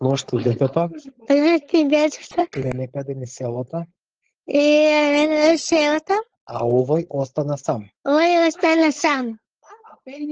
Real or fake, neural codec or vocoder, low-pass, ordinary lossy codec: fake; codec, 16 kHz, 4.8 kbps, FACodec; 7.2 kHz; Opus, 16 kbps